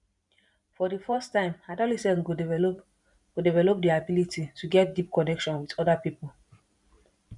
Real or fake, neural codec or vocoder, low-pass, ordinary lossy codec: fake; vocoder, 44.1 kHz, 128 mel bands every 512 samples, BigVGAN v2; 10.8 kHz; none